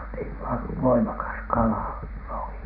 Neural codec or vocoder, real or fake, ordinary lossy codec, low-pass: vocoder, 44.1 kHz, 128 mel bands every 256 samples, BigVGAN v2; fake; none; 5.4 kHz